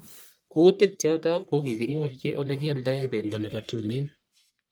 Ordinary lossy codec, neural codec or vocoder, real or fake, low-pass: none; codec, 44.1 kHz, 1.7 kbps, Pupu-Codec; fake; none